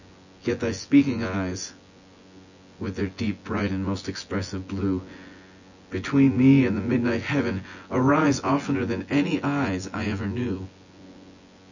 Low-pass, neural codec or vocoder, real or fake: 7.2 kHz; vocoder, 24 kHz, 100 mel bands, Vocos; fake